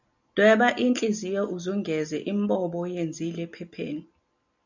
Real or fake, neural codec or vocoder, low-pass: real; none; 7.2 kHz